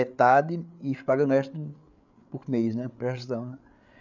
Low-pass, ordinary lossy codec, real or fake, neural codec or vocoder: 7.2 kHz; none; fake; codec, 16 kHz, 8 kbps, FreqCodec, larger model